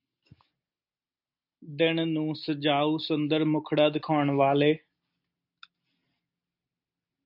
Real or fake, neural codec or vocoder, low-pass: real; none; 5.4 kHz